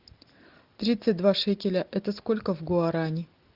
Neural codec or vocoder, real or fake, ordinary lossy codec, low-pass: none; real; Opus, 32 kbps; 5.4 kHz